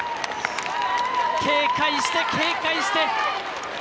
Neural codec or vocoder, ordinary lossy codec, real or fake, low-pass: none; none; real; none